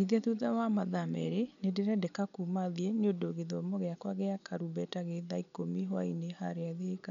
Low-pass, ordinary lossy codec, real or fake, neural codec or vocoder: 7.2 kHz; none; real; none